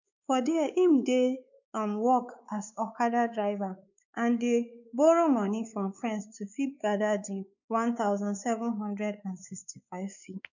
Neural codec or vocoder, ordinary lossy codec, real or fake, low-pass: codec, 16 kHz, 4 kbps, X-Codec, WavLM features, trained on Multilingual LibriSpeech; none; fake; 7.2 kHz